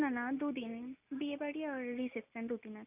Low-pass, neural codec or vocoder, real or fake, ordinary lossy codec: 3.6 kHz; none; real; none